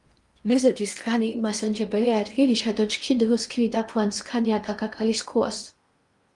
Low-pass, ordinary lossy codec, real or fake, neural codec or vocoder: 10.8 kHz; Opus, 32 kbps; fake; codec, 16 kHz in and 24 kHz out, 0.8 kbps, FocalCodec, streaming, 65536 codes